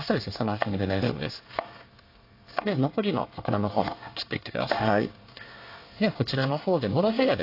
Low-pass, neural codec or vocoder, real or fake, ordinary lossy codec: 5.4 kHz; codec, 24 kHz, 1 kbps, SNAC; fake; AAC, 32 kbps